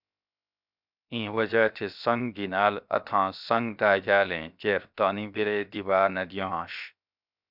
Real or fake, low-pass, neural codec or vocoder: fake; 5.4 kHz; codec, 16 kHz, 0.7 kbps, FocalCodec